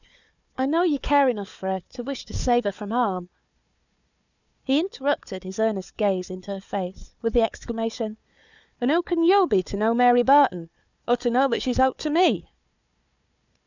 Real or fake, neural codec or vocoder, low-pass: fake; codec, 16 kHz, 4 kbps, FunCodec, trained on Chinese and English, 50 frames a second; 7.2 kHz